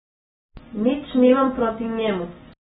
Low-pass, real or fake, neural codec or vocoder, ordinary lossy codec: 19.8 kHz; real; none; AAC, 16 kbps